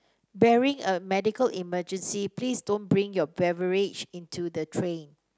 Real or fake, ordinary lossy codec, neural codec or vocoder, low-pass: real; none; none; none